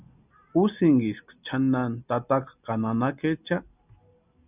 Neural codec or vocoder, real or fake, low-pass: none; real; 3.6 kHz